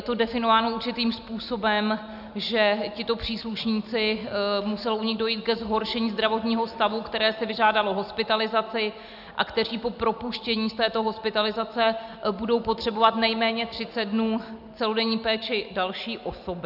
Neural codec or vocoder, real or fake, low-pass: none; real; 5.4 kHz